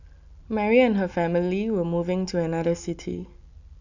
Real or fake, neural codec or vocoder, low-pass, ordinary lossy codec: real; none; 7.2 kHz; none